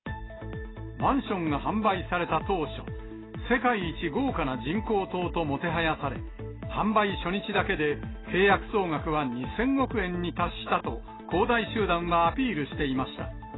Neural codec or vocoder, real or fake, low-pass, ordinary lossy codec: none; real; 7.2 kHz; AAC, 16 kbps